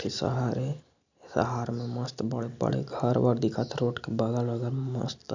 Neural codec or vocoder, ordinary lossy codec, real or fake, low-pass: none; none; real; 7.2 kHz